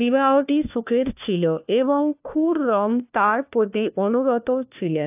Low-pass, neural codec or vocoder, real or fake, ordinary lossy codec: 3.6 kHz; codec, 16 kHz, 1 kbps, FunCodec, trained on LibriTTS, 50 frames a second; fake; AAC, 32 kbps